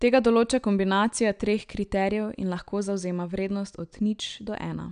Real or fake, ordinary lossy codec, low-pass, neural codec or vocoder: real; none; 9.9 kHz; none